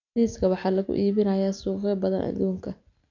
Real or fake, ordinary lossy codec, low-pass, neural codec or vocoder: real; none; 7.2 kHz; none